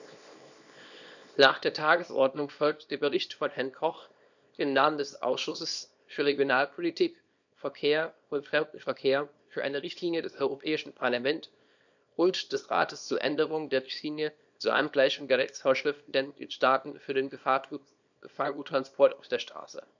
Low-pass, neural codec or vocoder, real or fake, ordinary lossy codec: 7.2 kHz; codec, 24 kHz, 0.9 kbps, WavTokenizer, small release; fake; none